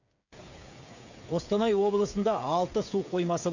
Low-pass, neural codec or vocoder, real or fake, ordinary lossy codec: 7.2 kHz; codec, 16 kHz, 8 kbps, FreqCodec, smaller model; fake; none